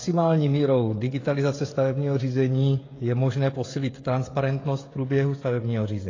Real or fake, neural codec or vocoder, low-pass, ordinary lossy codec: fake; codec, 16 kHz, 16 kbps, FreqCodec, smaller model; 7.2 kHz; AAC, 32 kbps